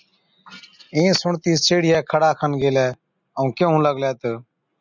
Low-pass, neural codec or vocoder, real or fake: 7.2 kHz; none; real